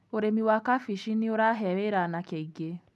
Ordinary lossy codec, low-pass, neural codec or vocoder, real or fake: none; none; none; real